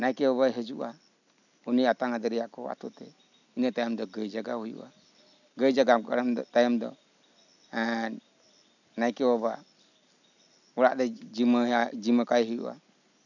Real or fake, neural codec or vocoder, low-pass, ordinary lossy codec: real; none; 7.2 kHz; none